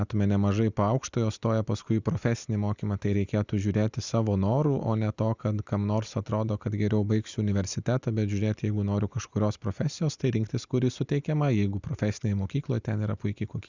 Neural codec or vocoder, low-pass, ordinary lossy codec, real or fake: none; 7.2 kHz; Opus, 64 kbps; real